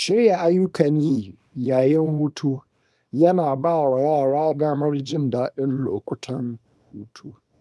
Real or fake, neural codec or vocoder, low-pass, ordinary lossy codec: fake; codec, 24 kHz, 0.9 kbps, WavTokenizer, small release; none; none